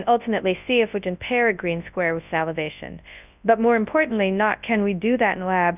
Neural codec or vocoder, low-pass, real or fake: codec, 24 kHz, 0.9 kbps, WavTokenizer, large speech release; 3.6 kHz; fake